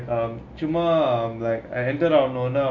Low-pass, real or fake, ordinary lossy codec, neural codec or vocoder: 7.2 kHz; real; AAC, 48 kbps; none